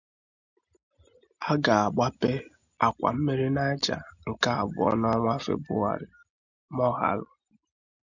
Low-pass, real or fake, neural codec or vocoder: 7.2 kHz; real; none